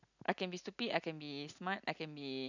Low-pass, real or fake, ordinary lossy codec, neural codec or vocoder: 7.2 kHz; real; none; none